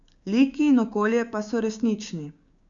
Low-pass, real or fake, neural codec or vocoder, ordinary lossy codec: 7.2 kHz; fake; codec, 16 kHz, 6 kbps, DAC; Opus, 64 kbps